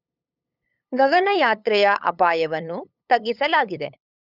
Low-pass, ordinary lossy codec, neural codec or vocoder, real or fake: 5.4 kHz; none; codec, 16 kHz, 8 kbps, FunCodec, trained on LibriTTS, 25 frames a second; fake